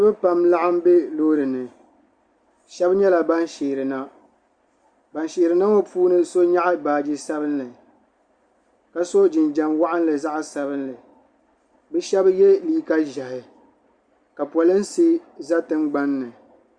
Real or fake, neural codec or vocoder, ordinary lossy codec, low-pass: real; none; Opus, 64 kbps; 9.9 kHz